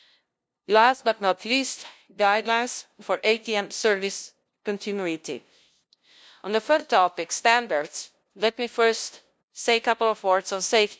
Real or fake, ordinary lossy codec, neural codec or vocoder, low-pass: fake; none; codec, 16 kHz, 0.5 kbps, FunCodec, trained on LibriTTS, 25 frames a second; none